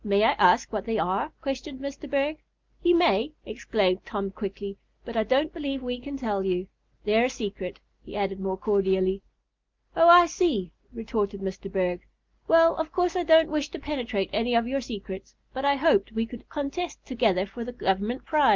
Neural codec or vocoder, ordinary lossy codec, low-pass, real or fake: none; Opus, 32 kbps; 7.2 kHz; real